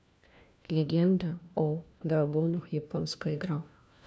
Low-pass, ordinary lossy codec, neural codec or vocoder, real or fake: none; none; codec, 16 kHz, 1 kbps, FunCodec, trained on LibriTTS, 50 frames a second; fake